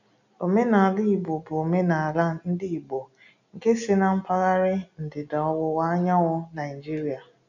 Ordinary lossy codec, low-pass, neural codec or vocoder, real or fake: none; 7.2 kHz; none; real